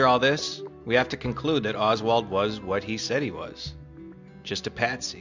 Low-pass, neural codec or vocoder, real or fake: 7.2 kHz; none; real